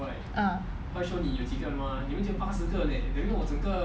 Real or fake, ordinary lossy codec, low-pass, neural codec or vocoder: real; none; none; none